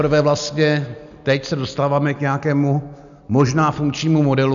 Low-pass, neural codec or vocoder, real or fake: 7.2 kHz; none; real